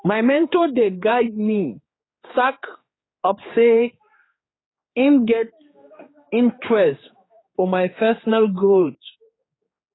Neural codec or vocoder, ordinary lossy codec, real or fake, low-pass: codec, 16 kHz, 4 kbps, X-Codec, HuBERT features, trained on general audio; AAC, 16 kbps; fake; 7.2 kHz